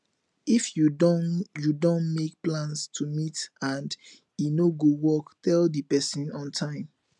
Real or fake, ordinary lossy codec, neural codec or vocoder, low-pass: real; none; none; 10.8 kHz